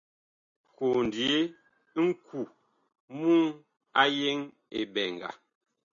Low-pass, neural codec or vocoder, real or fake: 7.2 kHz; none; real